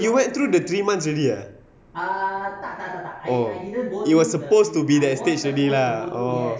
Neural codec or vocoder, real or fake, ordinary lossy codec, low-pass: none; real; none; none